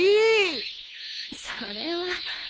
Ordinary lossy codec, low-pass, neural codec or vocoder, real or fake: none; none; codec, 16 kHz, 2 kbps, FunCodec, trained on Chinese and English, 25 frames a second; fake